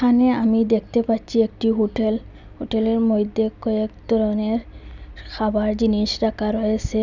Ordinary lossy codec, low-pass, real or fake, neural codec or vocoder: none; 7.2 kHz; real; none